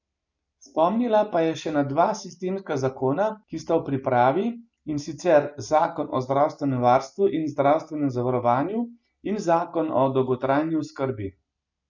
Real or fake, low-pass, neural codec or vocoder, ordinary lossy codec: real; 7.2 kHz; none; none